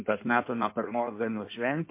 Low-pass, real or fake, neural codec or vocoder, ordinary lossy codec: 3.6 kHz; fake; codec, 16 kHz in and 24 kHz out, 1.1 kbps, FireRedTTS-2 codec; MP3, 24 kbps